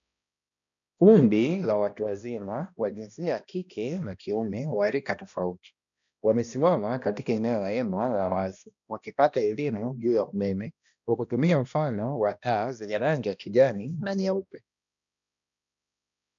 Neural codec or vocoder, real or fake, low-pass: codec, 16 kHz, 1 kbps, X-Codec, HuBERT features, trained on balanced general audio; fake; 7.2 kHz